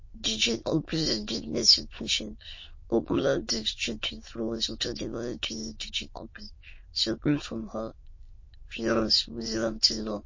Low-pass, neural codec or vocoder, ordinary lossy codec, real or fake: 7.2 kHz; autoencoder, 22.05 kHz, a latent of 192 numbers a frame, VITS, trained on many speakers; MP3, 32 kbps; fake